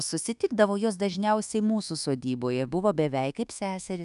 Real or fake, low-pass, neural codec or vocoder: fake; 10.8 kHz; codec, 24 kHz, 1.2 kbps, DualCodec